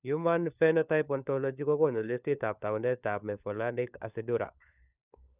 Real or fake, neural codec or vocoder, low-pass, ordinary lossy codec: fake; codec, 16 kHz, 4 kbps, FunCodec, trained on LibriTTS, 50 frames a second; 3.6 kHz; none